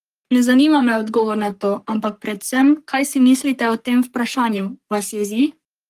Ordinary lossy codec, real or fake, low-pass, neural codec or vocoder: Opus, 24 kbps; fake; 14.4 kHz; codec, 44.1 kHz, 3.4 kbps, Pupu-Codec